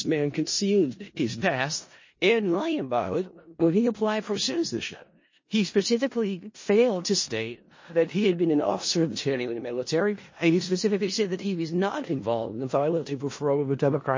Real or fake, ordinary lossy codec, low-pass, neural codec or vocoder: fake; MP3, 32 kbps; 7.2 kHz; codec, 16 kHz in and 24 kHz out, 0.4 kbps, LongCat-Audio-Codec, four codebook decoder